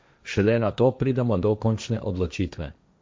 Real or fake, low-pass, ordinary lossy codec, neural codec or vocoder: fake; none; none; codec, 16 kHz, 1.1 kbps, Voila-Tokenizer